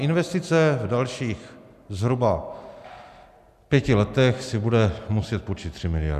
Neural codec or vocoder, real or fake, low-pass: none; real; 14.4 kHz